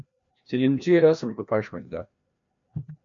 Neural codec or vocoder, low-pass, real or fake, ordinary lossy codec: codec, 16 kHz, 1 kbps, FreqCodec, larger model; 7.2 kHz; fake; MP3, 64 kbps